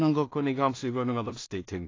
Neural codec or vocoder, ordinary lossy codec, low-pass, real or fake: codec, 16 kHz in and 24 kHz out, 0.4 kbps, LongCat-Audio-Codec, two codebook decoder; AAC, 32 kbps; 7.2 kHz; fake